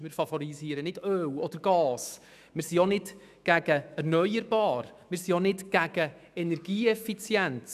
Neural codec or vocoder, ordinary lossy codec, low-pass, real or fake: autoencoder, 48 kHz, 128 numbers a frame, DAC-VAE, trained on Japanese speech; none; 14.4 kHz; fake